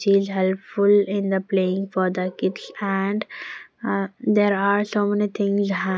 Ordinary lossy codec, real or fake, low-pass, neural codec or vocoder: none; real; none; none